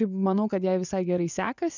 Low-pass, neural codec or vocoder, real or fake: 7.2 kHz; vocoder, 24 kHz, 100 mel bands, Vocos; fake